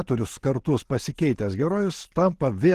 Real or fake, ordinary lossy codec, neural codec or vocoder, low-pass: real; Opus, 16 kbps; none; 14.4 kHz